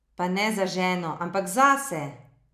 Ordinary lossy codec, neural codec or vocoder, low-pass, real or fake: none; none; 14.4 kHz; real